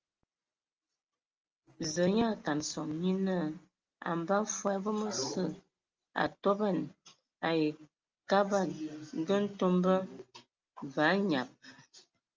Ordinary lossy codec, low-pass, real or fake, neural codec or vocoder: Opus, 24 kbps; 7.2 kHz; real; none